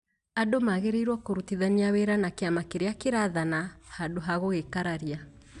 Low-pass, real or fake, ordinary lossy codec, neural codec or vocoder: 10.8 kHz; real; none; none